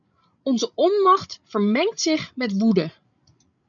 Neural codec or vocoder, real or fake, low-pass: codec, 16 kHz, 16 kbps, FreqCodec, larger model; fake; 7.2 kHz